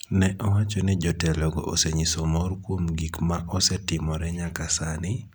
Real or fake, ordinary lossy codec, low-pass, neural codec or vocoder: real; none; none; none